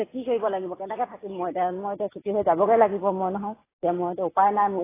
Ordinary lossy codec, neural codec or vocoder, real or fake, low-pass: AAC, 16 kbps; none; real; 3.6 kHz